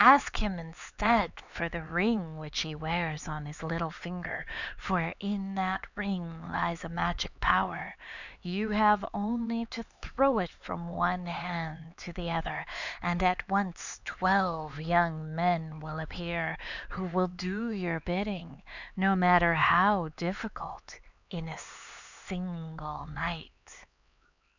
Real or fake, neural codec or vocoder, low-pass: fake; codec, 16 kHz, 4 kbps, X-Codec, HuBERT features, trained on LibriSpeech; 7.2 kHz